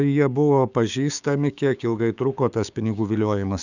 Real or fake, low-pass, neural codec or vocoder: fake; 7.2 kHz; codec, 16 kHz, 6 kbps, DAC